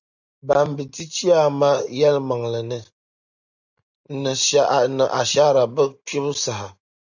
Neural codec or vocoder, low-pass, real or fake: none; 7.2 kHz; real